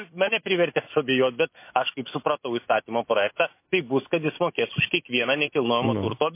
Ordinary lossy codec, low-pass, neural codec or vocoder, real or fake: MP3, 24 kbps; 3.6 kHz; autoencoder, 48 kHz, 128 numbers a frame, DAC-VAE, trained on Japanese speech; fake